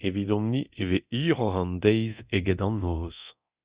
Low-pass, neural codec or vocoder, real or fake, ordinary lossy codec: 3.6 kHz; codec, 24 kHz, 0.9 kbps, DualCodec; fake; Opus, 64 kbps